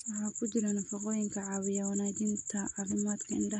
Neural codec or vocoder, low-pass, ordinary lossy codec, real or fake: none; 9.9 kHz; MP3, 48 kbps; real